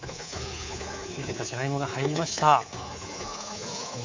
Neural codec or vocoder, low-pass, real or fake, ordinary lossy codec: codec, 24 kHz, 3.1 kbps, DualCodec; 7.2 kHz; fake; MP3, 64 kbps